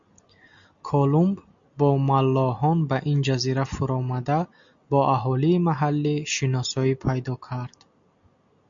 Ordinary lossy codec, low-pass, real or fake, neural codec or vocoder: MP3, 64 kbps; 7.2 kHz; real; none